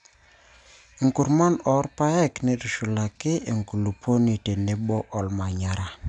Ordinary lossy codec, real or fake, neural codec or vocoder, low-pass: none; real; none; 9.9 kHz